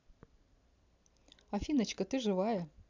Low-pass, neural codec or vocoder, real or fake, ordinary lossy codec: 7.2 kHz; none; real; none